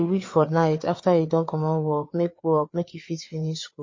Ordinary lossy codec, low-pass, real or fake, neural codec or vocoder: MP3, 32 kbps; 7.2 kHz; fake; codec, 16 kHz, 4 kbps, FreqCodec, larger model